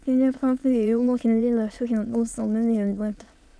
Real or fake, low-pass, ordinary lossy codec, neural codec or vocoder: fake; none; none; autoencoder, 22.05 kHz, a latent of 192 numbers a frame, VITS, trained on many speakers